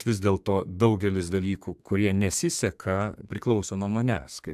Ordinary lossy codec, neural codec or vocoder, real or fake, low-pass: AAC, 96 kbps; codec, 32 kHz, 1.9 kbps, SNAC; fake; 14.4 kHz